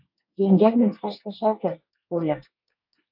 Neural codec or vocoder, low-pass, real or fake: codec, 24 kHz, 1 kbps, SNAC; 5.4 kHz; fake